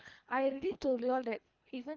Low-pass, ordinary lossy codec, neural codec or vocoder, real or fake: 7.2 kHz; Opus, 24 kbps; codec, 24 kHz, 3 kbps, HILCodec; fake